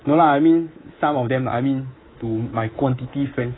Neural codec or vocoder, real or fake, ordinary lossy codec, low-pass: codec, 24 kHz, 3.1 kbps, DualCodec; fake; AAC, 16 kbps; 7.2 kHz